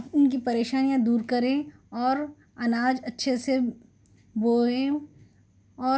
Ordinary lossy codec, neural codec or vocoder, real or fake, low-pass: none; none; real; none